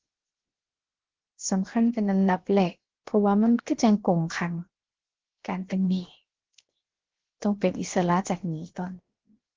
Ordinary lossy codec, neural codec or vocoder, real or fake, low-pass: Opus, 16 kbps; codec, 16 kHz, 0.7 kbps, FocalCodec; fake; 7.2 kHz